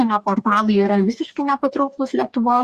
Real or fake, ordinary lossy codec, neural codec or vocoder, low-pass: fake; Opus, 64 kbps; codec, 44.1 kHz, 2.6 kbps, DAC; 14.4 kHz